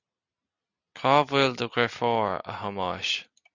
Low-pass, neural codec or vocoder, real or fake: 7.2 kHz; none; real